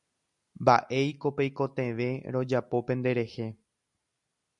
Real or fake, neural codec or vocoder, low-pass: real; none; 10.8 kHz